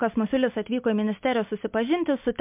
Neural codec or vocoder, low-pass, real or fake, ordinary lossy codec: none; 3.6 kHz; real; MP3, 32 kbps